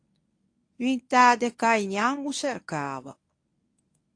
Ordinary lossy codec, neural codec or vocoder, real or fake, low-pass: AAC, 48 kbps; codec, 24 kHz, 0.9 kbps, WavTokenizer, medium speech release version 1; fake; 9.9 kHz